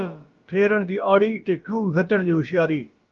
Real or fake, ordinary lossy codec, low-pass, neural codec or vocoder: fake; Opus, 32 kbps; 7.2 kHz; codec, 16 kHz, about 1 kbps, DyCAST, with the encoder's durations